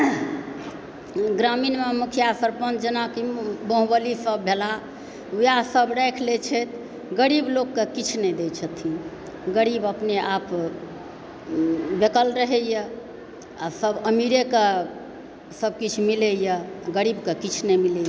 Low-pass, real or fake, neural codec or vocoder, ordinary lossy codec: none; real; none; none